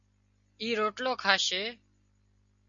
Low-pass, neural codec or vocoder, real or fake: 7.2 kHz; none; real